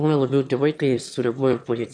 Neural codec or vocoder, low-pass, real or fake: autoencoder, 22.05 kHz, a latent of 192 numbers a frame, VITS, trained on one speaker; 9.9 kHz; fake